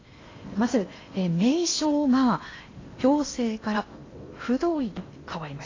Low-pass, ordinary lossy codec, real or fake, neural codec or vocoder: 7.2 kHz; AAC, 32 kbps; fake; codec, 16 kHz in and 24 kHz out, 0.8 kbps, FocalCodec, streaming, 65536 codes